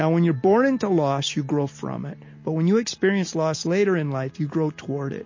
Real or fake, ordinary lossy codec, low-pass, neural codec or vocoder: real; MP3, 32 kbps; 7.2 kHz; none